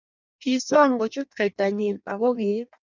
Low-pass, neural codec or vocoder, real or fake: 7.2 kHz; codec, 16 kHz in and 24 kHz out, 0.6 kbps, FireRedTTS-2 codec; fake